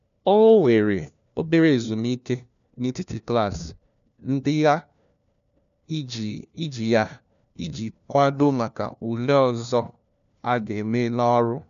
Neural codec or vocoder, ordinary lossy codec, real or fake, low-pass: codec, 16 kHz, 1 kbps, FunCodec, trained on LibriTTS, 50 frames a second; none; fake; 7.2 kHz